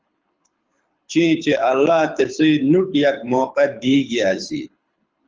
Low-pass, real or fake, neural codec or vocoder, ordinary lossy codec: 7.2 kHz; fake; codec, 24 kHz, 6 kbps, HILCodec; Opus, 24 kbps